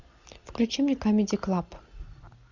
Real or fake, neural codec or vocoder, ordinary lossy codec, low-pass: real; none; Opus, 64 kbps; 7.2 kHz